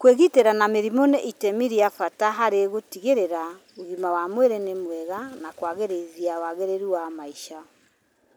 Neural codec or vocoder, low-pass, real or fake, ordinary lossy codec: none; none; real; none